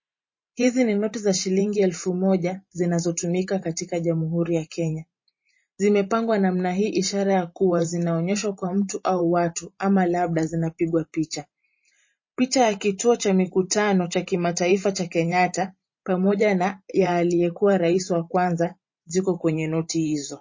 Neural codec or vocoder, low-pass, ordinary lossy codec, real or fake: vocoder, 44.1 kHz, 128 mel bands every 512 samples, BigVGAN v2; 7.2 kHz; MP3, 32 kbps; fake